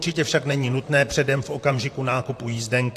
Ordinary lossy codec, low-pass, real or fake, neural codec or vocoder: AAC, 64 kbps; 14.4 kHz; fake; vocoder, 44.1 kHz, 128 mel bands, Pupu-Vocoder